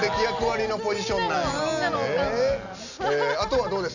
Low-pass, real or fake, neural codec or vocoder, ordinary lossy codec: 7.2 kHz; real; none; none